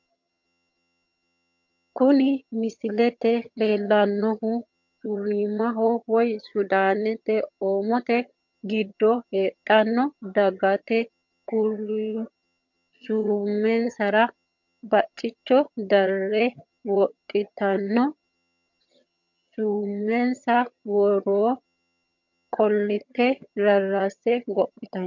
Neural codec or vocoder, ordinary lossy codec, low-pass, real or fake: vocoder, 22.05 kHz, 80 mel bands, HiFi-GAN; MP3, 48 kbps; 7.2 kHz; fake